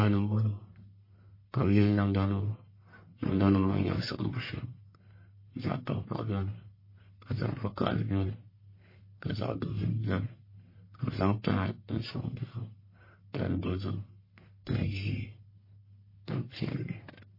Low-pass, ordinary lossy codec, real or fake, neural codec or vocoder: 5.4 kHz; MP3, 24 kbps; fake; codec, 44.1 kHz, 1.7 kbps, Pupu-Codec